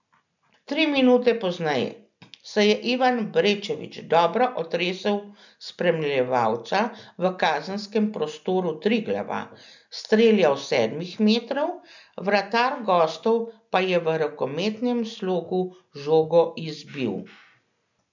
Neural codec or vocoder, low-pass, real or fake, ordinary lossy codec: none; 7.2 kHz; real; none